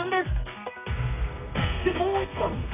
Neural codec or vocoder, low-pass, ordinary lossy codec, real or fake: codec, 32 kHz, 1.9 kbps, SNAC; 3.6 kHz; none; fake